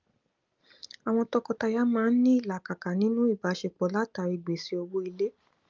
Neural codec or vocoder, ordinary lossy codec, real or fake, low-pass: none; Opus, 24 kbps; real; 7.2 kHz